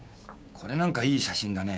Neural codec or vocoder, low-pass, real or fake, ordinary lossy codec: codec, 16 kHz, 6 kbps, DAC; none; fake; none